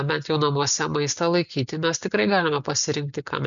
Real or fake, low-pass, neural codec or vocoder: real; 7.2 kHz; none